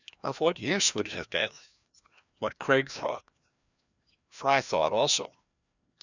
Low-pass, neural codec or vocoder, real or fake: 7.2 kHz; codec, 16 kHz, 1 kbps, FreqCodec, larger model; fake